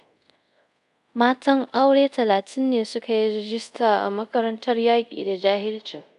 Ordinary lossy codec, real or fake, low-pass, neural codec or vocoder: none; fake; 10.8 kHz; codec, 24 kHz, 0.5 kbps, DualCodec